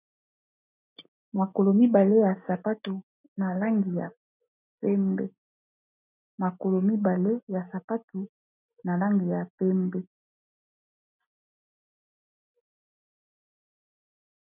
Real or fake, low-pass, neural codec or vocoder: real; 3.6 kHz; none